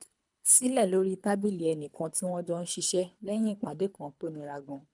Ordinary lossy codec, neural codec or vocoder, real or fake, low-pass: none; codec, 24 kHz, 3 kbps, HILCodec; fake; 10.8 kHz